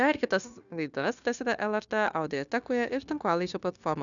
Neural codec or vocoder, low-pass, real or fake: codec, 16 kHz, 0.9 kbps, LongCat-Audio-Codec; 7.2 kHz; fake